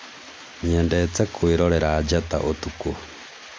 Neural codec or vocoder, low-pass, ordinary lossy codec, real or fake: none; none; none; real